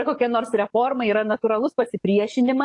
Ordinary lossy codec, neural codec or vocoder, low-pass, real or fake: AAC, 48 kbps; codec, 44.1 kHz, 7.8 kbps, DAC; 10.8 kHz; fake